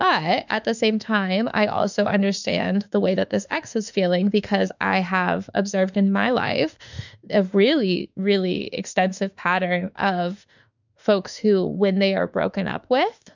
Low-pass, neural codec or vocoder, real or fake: 7.2 kHz; autoencoder, 48 kHz, 32 numbers a frame, DAC-VAE, trained on Japanese speech; fake